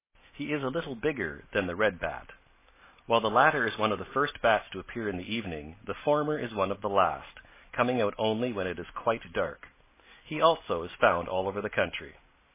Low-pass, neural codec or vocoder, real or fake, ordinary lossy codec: 3.6 kHz; none; real; MP3, 16 kbps